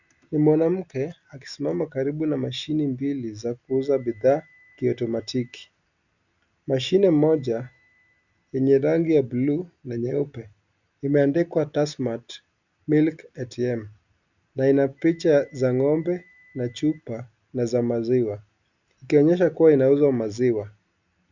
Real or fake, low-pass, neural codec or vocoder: real; 7.2 kHz; none